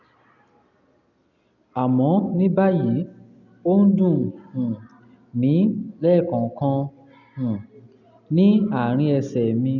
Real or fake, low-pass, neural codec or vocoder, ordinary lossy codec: real; 7.2 kHz; none; none